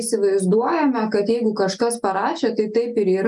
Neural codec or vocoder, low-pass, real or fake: none; 10.8 kHz; real